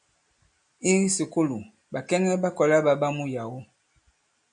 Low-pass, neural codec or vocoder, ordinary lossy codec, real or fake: 9.9 kHz; none; MP3, 96 kbps; real